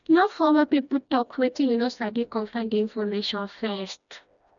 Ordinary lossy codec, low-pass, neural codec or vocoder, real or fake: none; 7.2 kHz; codec, 16 kHz, 1 kbps, FreqCodec, smaller model; fake